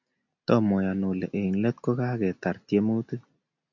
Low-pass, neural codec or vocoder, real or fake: 7.2 kHz; none; real